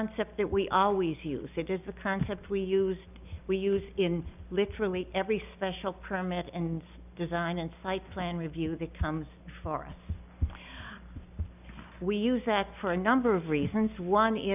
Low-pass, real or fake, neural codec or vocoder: 3.6 kHz; real; none